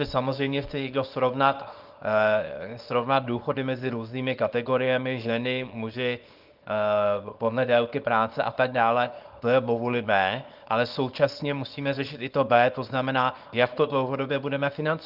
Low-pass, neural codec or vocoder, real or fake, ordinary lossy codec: 5.4 kHz; codec, 24 kHz, 0.9 kbps, WavTokenizer, small release; fake; Opus, 24 kbps